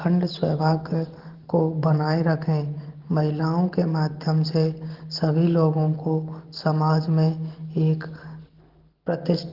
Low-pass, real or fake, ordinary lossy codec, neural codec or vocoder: 5.4 kHz; real; Opus, 16 kbps; none